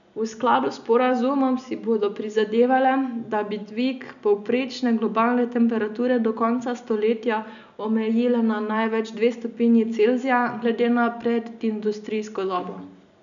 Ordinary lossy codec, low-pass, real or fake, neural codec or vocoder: none; 7.2 kHz; real; none